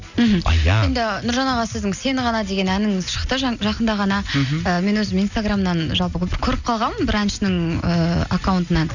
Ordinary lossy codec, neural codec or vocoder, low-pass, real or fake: none; none; 7.2 kHz; real